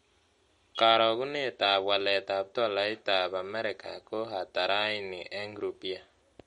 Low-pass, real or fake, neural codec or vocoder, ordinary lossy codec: 19.8 kHz; real; none; MP3, 48 kbps